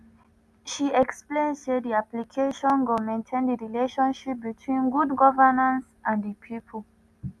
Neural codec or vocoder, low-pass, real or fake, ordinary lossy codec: none; none; real; none